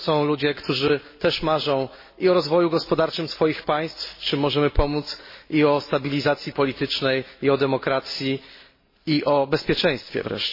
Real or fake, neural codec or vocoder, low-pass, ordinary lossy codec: real; none; 5.4 kHz; MP3, 24 kbps